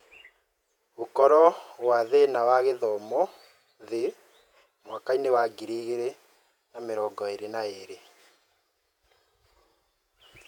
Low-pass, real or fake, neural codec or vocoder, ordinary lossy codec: 19.8 kHz; fake; vocoder, 48 kHz, 128 mel bands, Vocos; none